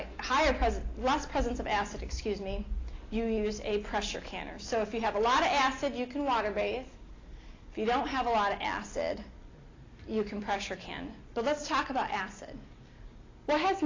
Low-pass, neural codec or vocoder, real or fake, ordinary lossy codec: 7.2 kHz; none; real; AAC, 32 kbps